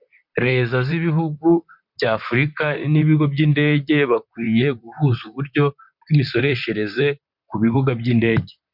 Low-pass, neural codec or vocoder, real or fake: 5.4 kHz; vocoder, 44.1 kHz, 128 mel bands, Pupu-Vocoder; fake